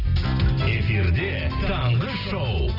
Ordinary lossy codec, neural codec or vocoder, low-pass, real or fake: none; none; 5.4 kHz; real